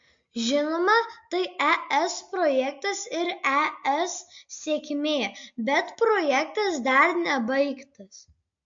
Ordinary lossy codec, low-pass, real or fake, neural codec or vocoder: MP3, 48 kbps; 7.2 kHz; real; none